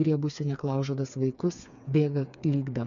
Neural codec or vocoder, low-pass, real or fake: codec, 16 kHz, 4 kbps, FreqCodec, smaller model; 7.2 kHz; fake